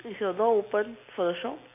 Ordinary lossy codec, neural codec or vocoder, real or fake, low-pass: MP3, 24 kbps; none; real; 3.6 kHz